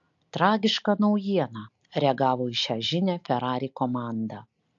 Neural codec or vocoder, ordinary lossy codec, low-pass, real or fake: none; AAC, 64 kbps; 7.2 kHz; real